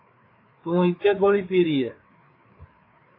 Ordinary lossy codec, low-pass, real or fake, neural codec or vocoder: AAC, 24 kbps; 5.4 kHz; fake; codec, 16 kHz, 4 kbps, FreqCodec, larger model